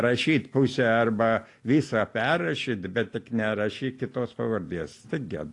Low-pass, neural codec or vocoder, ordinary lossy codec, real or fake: 10.8 kHz; none; AAC, 48 kbps; real